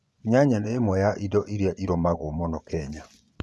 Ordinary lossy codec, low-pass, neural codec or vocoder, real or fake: none; none; vocoder, 24 kHz, 100 mel bands, Vocos; fake